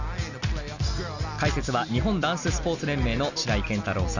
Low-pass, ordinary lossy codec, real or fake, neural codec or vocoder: 7.2 kHz; none; real; none